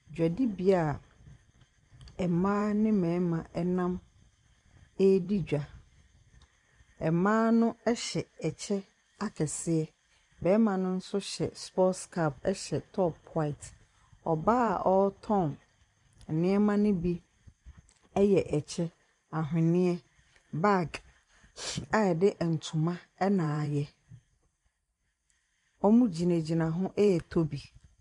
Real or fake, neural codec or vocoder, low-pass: fake; vocoder, 44.1 kHz, 128 mel bands every 512 samples, BigVGAN v2; 10.8 kHz